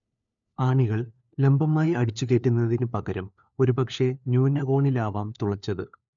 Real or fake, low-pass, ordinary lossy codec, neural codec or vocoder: fake; 7.2 kHz; none; codec, 16 kHz, 4 kbps, FunCodec, trained on LibriTTS, 50 frames a second